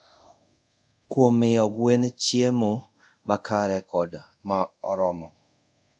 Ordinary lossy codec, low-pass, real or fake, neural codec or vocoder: none; 10.8 kHz; fake; codec, 24 kHz, 0.5 kbps, DualCodec